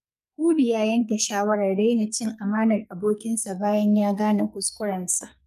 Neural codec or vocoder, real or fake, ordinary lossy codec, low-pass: codec, 44.1 kHz, 2.6 kbps, SNAC; fake; none; 14.4 kHz